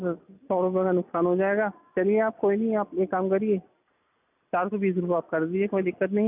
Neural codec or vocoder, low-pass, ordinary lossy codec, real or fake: none; 3.6 kHz; none; real